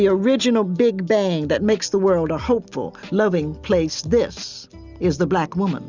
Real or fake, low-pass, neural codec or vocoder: real; 7.2 kHz; none